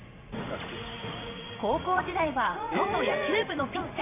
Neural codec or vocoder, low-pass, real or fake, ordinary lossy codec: vocoder, 44.1 kHz, 80 mel bands, Vocos; 3.6 kHz; fake; none